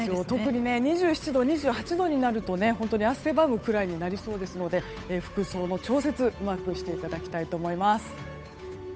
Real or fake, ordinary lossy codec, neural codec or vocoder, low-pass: fake; none; codec, 16 kHz, 8 kbps, FunCodec, trained on Chinese and English, 25 frames a second; none